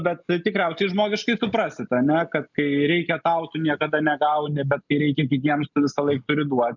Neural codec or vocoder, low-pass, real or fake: none; 7.2 kHz; real